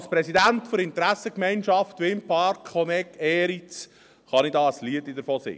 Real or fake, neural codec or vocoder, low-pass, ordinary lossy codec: real; none; none; none